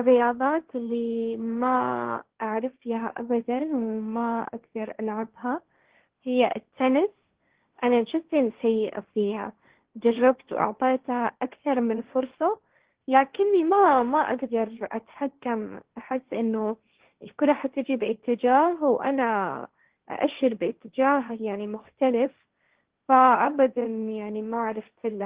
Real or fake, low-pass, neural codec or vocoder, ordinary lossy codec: fake; 3.6 kHz; codec, 16 kHz, 1.1 kbps, Voila-Tokenizer; Opus, 16 kbps